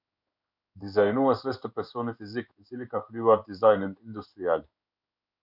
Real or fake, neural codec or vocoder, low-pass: fake; codec, 16 kHz in and 24 kHz out, 1 kbps, XY-Tokenizer; 5.4 kHz